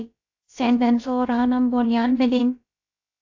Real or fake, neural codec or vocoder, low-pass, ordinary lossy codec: fake; codec, 16 kHz, about 1 kbps, DyCAST, with the encoder's durations; 7.2 kHz; AAC, 48 kbps